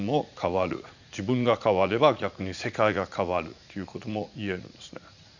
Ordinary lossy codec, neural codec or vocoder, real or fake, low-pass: Opus, 64 kbps; vocoder, 44.1 kHz, 80 mel bands, Vocos; fake; 7.2 kHz